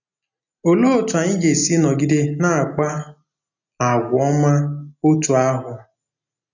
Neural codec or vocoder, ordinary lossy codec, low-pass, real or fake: none; none; 7.2 kHz; real